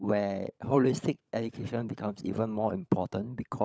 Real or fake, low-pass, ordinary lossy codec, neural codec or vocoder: fake; none; none; codec, 16 kHz, 16 kbps, FunCodec, trained on LibriTTS, 50 frames a second